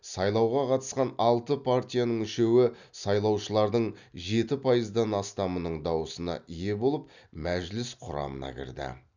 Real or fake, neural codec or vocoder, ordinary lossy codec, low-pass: real; none; none; 7.2 kHz